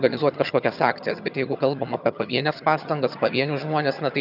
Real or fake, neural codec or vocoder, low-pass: fake; vocoder, 22.05 kHz, 80 mel bands, HiFi-GAN; 5.4 kHz